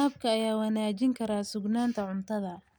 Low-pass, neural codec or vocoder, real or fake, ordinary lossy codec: none; none; real; none